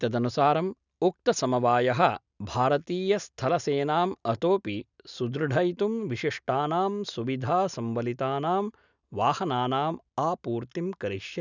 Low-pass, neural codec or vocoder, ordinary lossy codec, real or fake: 7.2 kHz; none; none; real